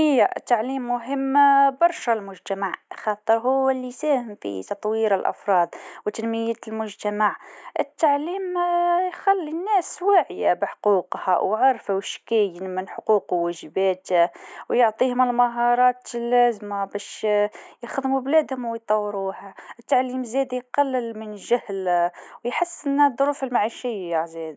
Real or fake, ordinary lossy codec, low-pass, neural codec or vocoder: real; none; none; none